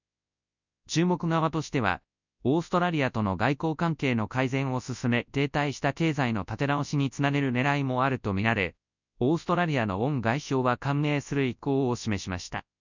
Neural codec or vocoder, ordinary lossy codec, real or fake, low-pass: codec, 24 kHz, 0.9 kbps, WavTokenizer, large speech release; none; fake; 7.2 kHz